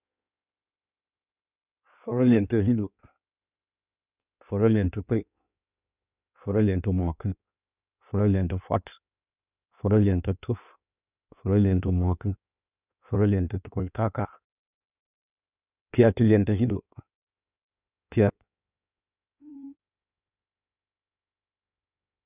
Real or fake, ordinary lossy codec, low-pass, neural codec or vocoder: fake; none; 3.6 kHz; codec, 16 kHz in and 24 kHz out, 1.1 kbps, FireRedTTS-2 codec